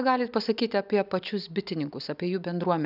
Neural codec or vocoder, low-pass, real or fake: none; 5.4 kHz; real